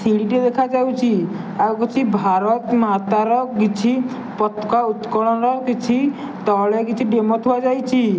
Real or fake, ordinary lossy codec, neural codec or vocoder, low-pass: real; none; none; none